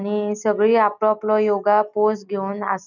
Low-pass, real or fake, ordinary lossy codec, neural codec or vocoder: 7.2 kHz; real; none; none